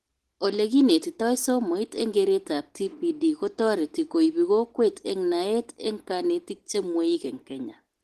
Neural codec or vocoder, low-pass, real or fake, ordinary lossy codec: none; 14.4 kHz; real; Opus, 16 kbps